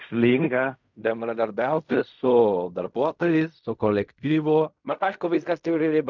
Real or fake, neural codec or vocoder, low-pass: fake; codec, 16 kHz in and 24 kHz out, 0.4 kbps, LongCat-Audio-Codec, fine tuned four codebook decoder; 7.2 kHz